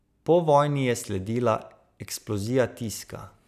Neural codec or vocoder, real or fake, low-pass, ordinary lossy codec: none; real; 14.4 kHz; none